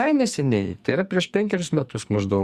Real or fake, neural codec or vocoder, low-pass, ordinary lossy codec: fake; codec, 44.1 kHz, 2.6 kbps, SNAC; 14.4 kHz; Opus, 64 kbps